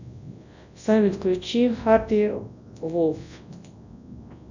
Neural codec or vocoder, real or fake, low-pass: codec, 24 kHz, 0.9 kbps, WavTokenizer, large speech release; fake; 7.2 kHz